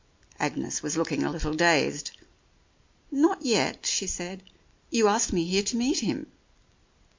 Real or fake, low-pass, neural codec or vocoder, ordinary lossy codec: real; 7.2 kHz; none; MP3, 48 kbps